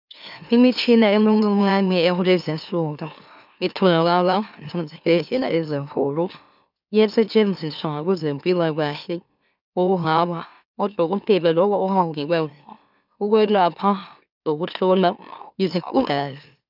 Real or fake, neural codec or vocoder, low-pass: fake; autoencoder, 44.1 kHz, a latent of 192 numbers a frame, MeloTTS; 5.4 kHz